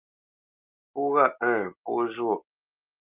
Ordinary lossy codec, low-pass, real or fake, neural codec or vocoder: Opus, 24 kbps; 3.6 kHz; real; none